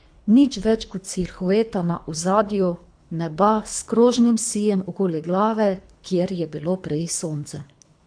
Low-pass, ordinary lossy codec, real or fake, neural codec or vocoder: 9.9 kHz; AAC, 64 kbps; fake; codec, 24 kHz, 3 kbps, HILCodec